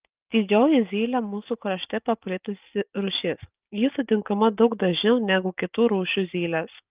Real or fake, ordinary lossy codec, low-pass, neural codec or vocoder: real; Opus, 24 kbps; 3.6 kHz; none